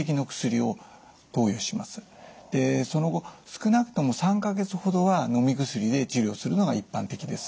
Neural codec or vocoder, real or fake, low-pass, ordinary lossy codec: none; real; none; none